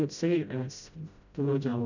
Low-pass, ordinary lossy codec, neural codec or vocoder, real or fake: 7.2 kHz; none; codec, 16 kHz, 0.5 kbps, FreqCodec, smaller model; fake